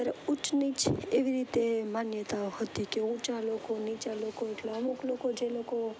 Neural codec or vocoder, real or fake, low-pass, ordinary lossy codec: none; real; none; none